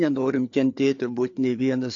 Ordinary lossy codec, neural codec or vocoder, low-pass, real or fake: AAC, 48 kbps; codec, 16 kHz, 4 kbps, FreqCodec, larger model; 7.2 kHz; fake